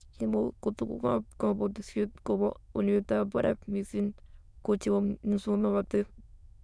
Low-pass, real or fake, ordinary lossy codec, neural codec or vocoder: none; fake; none; autoencoder, 22.05 kHz, a latent of 192 numbers a frame, VITS, trained on many speakers